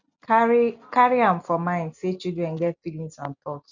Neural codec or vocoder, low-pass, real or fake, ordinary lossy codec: none; 7.2 kHz; real; none